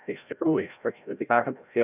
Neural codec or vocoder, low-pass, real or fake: codec, 16 kHz, 0.5 kbps, FreqCodec, larger model; 3.6 kHz; fake